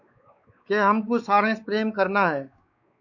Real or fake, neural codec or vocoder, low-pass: fake; codec, 16 kHz, 4 kbps, X-Codec, WavLM features, trained on Multilingual LibriSpeech; 7.2 kHz